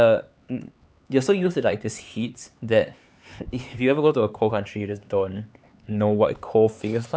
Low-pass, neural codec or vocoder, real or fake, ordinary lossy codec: none; codec, 16 kHz, 4 kbps, X-Codec, HuBERT features, trained on LibriSpeech; fake; none